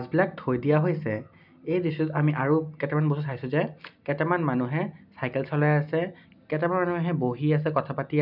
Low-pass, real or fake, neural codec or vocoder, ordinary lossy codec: 5.4 kHz; real; none; none